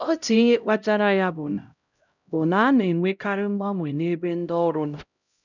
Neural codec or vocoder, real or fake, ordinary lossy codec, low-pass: codec, 16 kHz, 0.5 kbps, X-Codec, HuBERT features, trained on LibriSpeech; fake; none; 7.2 kHz